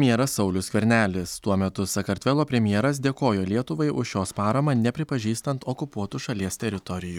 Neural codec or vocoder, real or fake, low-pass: none; real; 19.8 kHz